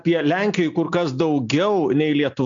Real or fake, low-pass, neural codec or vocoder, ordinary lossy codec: real; 7.2 kHz; none; MP3, 64 kbps